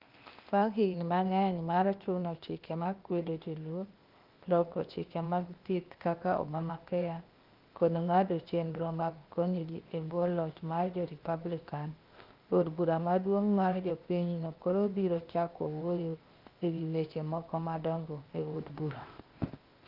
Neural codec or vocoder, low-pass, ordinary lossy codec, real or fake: codec, 16 kHz, 0.8 kbps, ZipCodec; 5.4 kHz; Opus, 24 kbps; fake